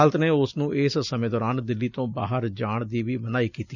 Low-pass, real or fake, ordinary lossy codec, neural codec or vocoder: 7.2 kHz; real; none; none